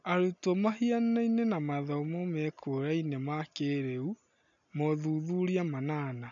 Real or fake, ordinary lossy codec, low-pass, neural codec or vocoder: real; none; 7.2 kHz; none